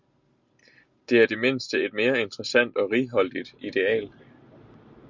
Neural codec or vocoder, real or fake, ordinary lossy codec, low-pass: none; real; Opus, 64 kbps; 7.2 kHz